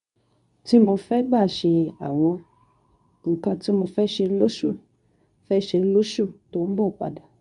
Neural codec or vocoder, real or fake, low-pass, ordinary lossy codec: codec, 24 kHz, 0.9 kbps, WavTokenizer, medium speech release version 2; fake; 10.8 kHz; none